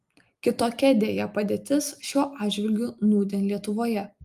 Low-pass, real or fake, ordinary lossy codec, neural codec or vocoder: 14.4 kHz; real; Opus, 32 kbps; none